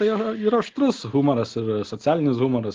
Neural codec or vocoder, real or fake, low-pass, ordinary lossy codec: none; real; 7.2 kHz; Opus, 16 kbps